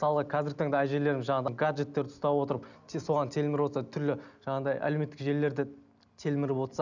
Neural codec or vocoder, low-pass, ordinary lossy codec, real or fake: none; 7.2 kHz; none; real